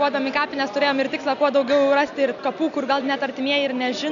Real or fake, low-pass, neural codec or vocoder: real; 7.2 kHz; none